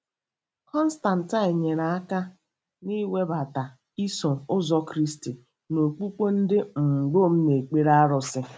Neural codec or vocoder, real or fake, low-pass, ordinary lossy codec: none; real; none; none